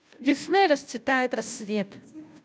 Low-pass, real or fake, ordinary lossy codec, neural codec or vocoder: none; fake; none; codec, 16 kHz, 0.5 kbps, FunCodec, trained on Chinese and English, 25 frames a second